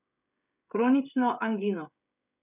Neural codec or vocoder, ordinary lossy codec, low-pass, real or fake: codec, 16 kHz, 16 kbps, FreqCodec, smaller model; none; 3.6 kHz; fake